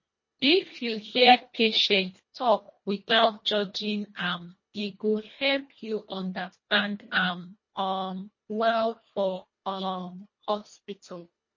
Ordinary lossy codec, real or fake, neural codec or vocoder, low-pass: MP3, 32 kbps; fake; codec, 24 kHz, 1.5 kbps, HILCodec; 7.2 kHz